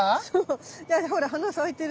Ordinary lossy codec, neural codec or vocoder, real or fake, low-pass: none; none; real; none